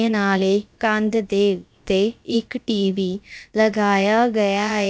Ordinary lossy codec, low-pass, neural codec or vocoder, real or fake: none; none; codec, 16 kHz, about 1 kbps, DyCAST, with the encoder's durations; fake